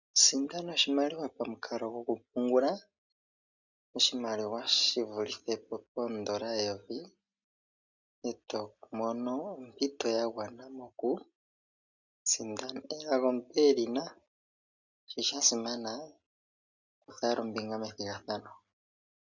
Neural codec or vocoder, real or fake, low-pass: none; real; 7.2 kHz